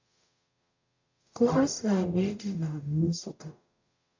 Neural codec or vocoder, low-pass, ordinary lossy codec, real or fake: codec, 44.1 kHz, 0.9 kbps, DAC; 7.2 kHz; AAC, 48 kbps; fake